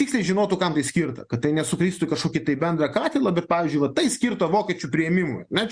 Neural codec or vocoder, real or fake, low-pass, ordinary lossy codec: none; real; 9.9 kHz; Opus, 64 kbps